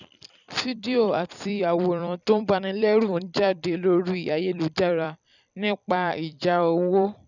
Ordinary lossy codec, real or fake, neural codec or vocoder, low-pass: none; fake; vocoder, 44.1 kHz, 128 mel bands every 256 samples, BigVGAN v2; 7.2 kHz